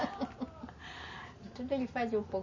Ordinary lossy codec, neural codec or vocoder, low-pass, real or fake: MP3, 32 kbps; none; 7.2 kHz; real